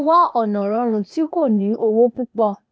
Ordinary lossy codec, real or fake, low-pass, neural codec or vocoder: none; fake; none; codec, 16 kHz, 0.8 kbps, ZipCodec